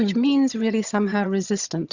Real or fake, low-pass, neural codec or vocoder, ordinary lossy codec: fake; 7.2 kHz; vocoder, 22.05 kHz, 80 mel bands, HiFi-GAN; Opus, 64 kbps